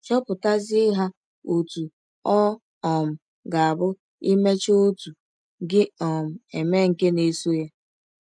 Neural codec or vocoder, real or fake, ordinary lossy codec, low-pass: none; real; none; 9.9 kHz